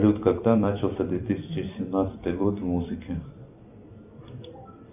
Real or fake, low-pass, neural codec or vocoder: fake; 3.6 kHz; vocoder, 44.1 kHz, 128 mel bands every 256 samples, BigVGAN v2